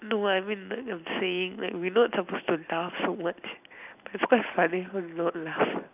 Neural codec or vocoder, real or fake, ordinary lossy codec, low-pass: none; real; none; 3.6 kHz